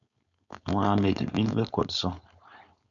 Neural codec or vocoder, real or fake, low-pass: codec, 16 kHz, 4.8 kbps, FACodec; fake; 7.2 kHz